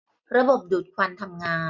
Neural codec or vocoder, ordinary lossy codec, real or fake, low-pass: none; none; real; 7.2 kHz